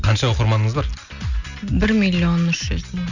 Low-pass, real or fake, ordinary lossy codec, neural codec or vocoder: 7.2 kHz; real; none; none